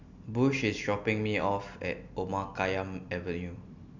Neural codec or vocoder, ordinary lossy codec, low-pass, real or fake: none; none; 7.2 kHz; real